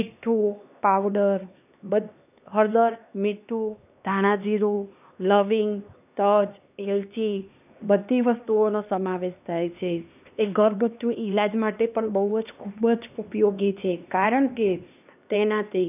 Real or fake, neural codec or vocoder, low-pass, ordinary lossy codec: fake; codec, 16 kHz, 2 kbps, X-Codec, HuBERT features, trained on LibriSpeech; 3.6 kHz; none